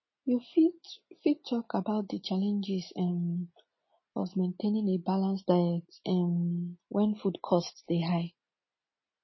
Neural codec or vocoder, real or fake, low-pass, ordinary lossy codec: none; real; 7.2 kHz; MP3, 24 kbps